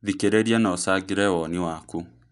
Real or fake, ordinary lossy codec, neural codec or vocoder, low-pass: real; none; none; 10.8 kHz